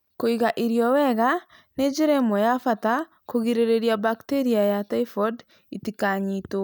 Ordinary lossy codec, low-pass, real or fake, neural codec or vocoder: none; none; real; none